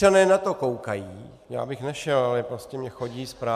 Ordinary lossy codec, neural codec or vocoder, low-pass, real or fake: MP3, 96 kbps; none; 14.4 kHz; real